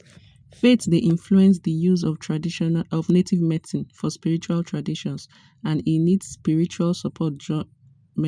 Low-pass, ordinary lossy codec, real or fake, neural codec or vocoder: 9.9 kHz; none; real; none